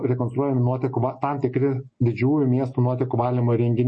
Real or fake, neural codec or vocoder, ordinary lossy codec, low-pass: real; none; MP3, 32 kbps; 9.9 kHz